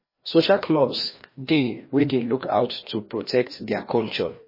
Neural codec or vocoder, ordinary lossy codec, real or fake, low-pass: codec, 16 kHz, 1 kbps, FreqCodec, larger model; MP3, 24 kbps; fake; 5.4 kHz